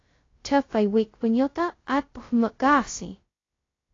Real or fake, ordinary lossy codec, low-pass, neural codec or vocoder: fake; AAC, 32 kbps; 7.2 kHz; codec, 16 kHz, 0.2 kbps, FocalCodec